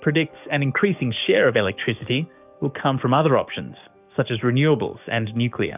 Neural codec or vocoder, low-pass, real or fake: codec, 44.1 kHz, 7.8 kbps, DAC; 3.6 kHz; fake